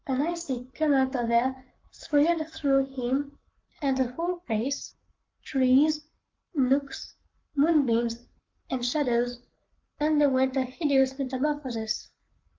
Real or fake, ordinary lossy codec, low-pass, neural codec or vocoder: fake; Opus, 24 kbps; 7.2 kHz; codec, 44.1 kHz, 7.8 kbps, Pupu-Codec